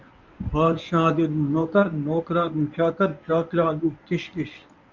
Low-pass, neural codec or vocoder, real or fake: 7.2 kHz; codec, 24 kHz, 0.9 kbps, WavTokenizer, medium speech release version 1; fake